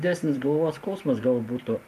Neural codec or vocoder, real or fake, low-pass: vocoder, 44.1 kHz, 128 mel bands every 512 samples, BigVGAN v2; fake; 14.4 kHz